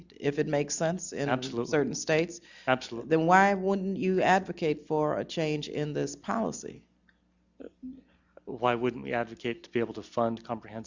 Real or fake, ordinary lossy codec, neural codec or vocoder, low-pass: real; Opus, 64 kbps; none; 7.2 kHz